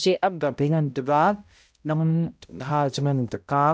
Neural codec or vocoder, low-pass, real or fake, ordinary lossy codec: codec, 16 kHz, 0.5 kbps, X-Codec, HuBERT features, trained on balanced general audio; none; fake; none